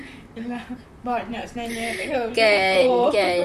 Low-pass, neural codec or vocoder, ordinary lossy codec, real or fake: 14.4 kHz; vocoder, 44.1 kHz, 128 mel bands, Pupu-Vocoder; none; fake